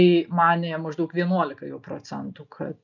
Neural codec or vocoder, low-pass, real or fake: none; 7.2 kHz; real